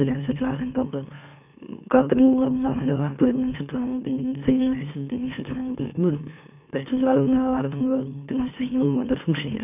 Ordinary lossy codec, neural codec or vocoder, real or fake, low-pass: none; autoencoder, 44.1 kHz, a latent of 192 numbers a frame, MeloTTS; fake; 3.6 kHz